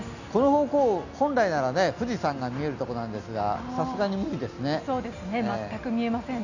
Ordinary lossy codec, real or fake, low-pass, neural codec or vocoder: none; real; 7.2 kHz; none